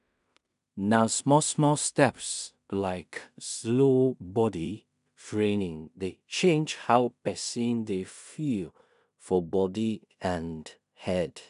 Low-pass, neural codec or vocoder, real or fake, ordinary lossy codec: 10.8 kHz; codec, 16 kHz in and 24 kHz out, 0.4 kbps, LongCat-Audio-Codec, two codebook decoder; fake; none